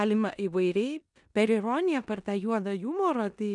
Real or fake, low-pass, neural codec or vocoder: fake; 10.8 kHz; codec, 16 kHz in and 24 kHz out, 0.9 kbps, LongCat-Audio-Codec, four codebook decoder